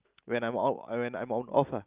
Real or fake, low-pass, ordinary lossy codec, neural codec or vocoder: real; 3.6 kHz; Opus, 32 kbps; none